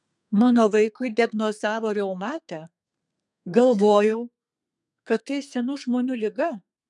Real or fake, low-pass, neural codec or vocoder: fake; 10.8 kHz; codec, 32 kHz, 1.9 kbps, SNAC